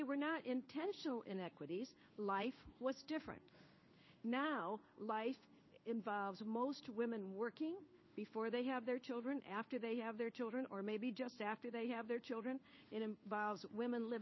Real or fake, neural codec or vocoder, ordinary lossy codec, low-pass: fake; codec, 16 kHz in and 24 kHz out, 1 kbps, XY-Tokenizer; MP3, 24 kbps; 7.2 kHz